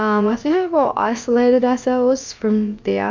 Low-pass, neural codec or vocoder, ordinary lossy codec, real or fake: 7.2 kHz; codec, 16 kHz, about 1 kbps, DyCAST, with the encoder's durations; MP3, 64 kbps; fake